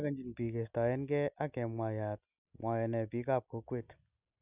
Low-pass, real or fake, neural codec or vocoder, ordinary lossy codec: 3.6 kHz; real; none; none